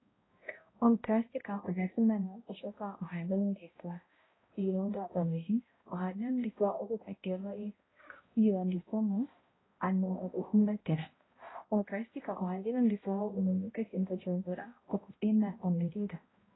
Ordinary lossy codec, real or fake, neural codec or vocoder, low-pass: AAC, 16 kbps; fake; codec, 16 kHz, 0.5 kbps, X-Codec, HuBERT features, trained on balanced general audio; 7.2 kHz